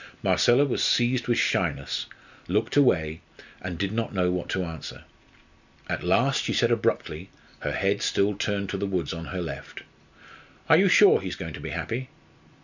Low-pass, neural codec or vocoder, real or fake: 7.2 kHz; none; real